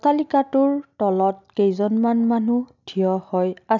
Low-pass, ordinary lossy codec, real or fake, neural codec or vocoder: 7.2 kHz; none; real; none